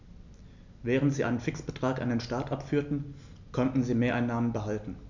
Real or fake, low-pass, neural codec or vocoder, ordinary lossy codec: real; 7.2 kHz; none; none